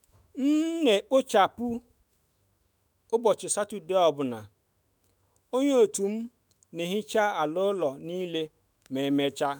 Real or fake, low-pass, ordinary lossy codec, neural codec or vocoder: fake; none; none; autoencoder, 48 kHz, 128 numbers a frame, DAC-VAE, trained on Japanese speech